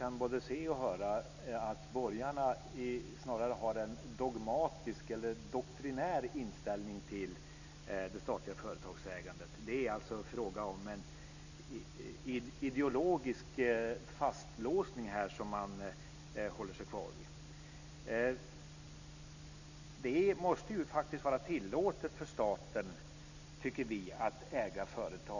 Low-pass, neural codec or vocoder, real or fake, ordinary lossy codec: 7.2 kHz; none; real; none